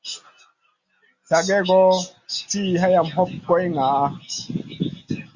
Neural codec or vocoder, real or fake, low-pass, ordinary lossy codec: none; real; 7.2 kHz; Opus, 64 kbps